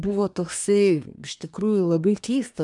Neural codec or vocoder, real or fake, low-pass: codec, 24 kHz, 1 kbps, SNAC; fake; 10.8 kHz